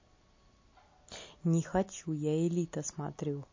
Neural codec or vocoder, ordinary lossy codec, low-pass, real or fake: none; MP3, 32 kbps; 7.2 kHz; real